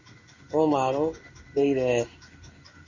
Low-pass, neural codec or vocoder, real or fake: 7.2 kHz; codec, 16 kHz in and 24 kHz out, 1 kbps, XY-Tokenizer; fake